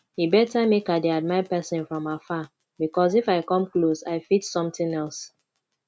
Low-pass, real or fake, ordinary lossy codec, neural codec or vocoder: none; real; none; none